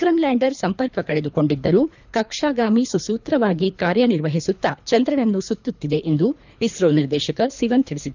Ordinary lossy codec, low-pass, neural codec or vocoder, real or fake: none; 7.2 kHz; codec, 24 kHz, 3 kbps, HILCodec; fake